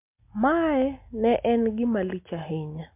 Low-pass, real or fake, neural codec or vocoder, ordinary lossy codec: 3.6 kHz; real; none; MP3, 32 kbps